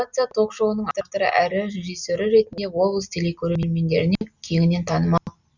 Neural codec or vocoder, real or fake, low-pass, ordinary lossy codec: none; real; 7.2 kHz; none